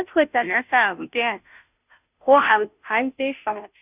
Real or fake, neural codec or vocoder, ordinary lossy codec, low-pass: fake; codec, 16 kHz, 0.5 kbps, FunCodec, trained on Chinese and English, 25 frames a second; none; 3.6 kHz